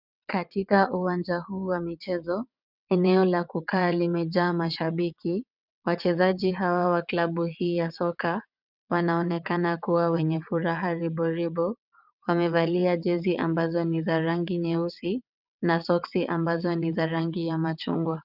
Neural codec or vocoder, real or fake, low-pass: vocoder, 22.05 kHz, 80 mel bands, WaveNeXt; fake; 5.4 kHz